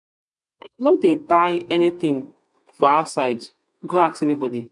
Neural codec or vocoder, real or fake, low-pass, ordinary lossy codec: codec, 32 kHz, 1.9 kbps, SNAC; fake; 10.8 kHz; none